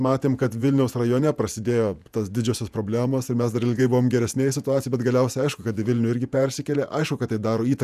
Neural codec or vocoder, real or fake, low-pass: vocoder, 48 kHz, 128 mel bands, Vocos; fake; 14.4 kHz